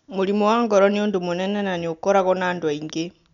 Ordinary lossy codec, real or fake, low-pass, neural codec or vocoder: none; real; 7.2 kHz; none